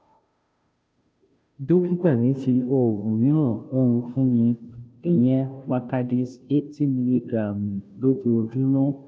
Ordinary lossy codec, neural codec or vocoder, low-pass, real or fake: none; codec, 16 kHz, 0.5 kbps, FunCodec, trained on Chinese and English, 25 frames a second; none; fake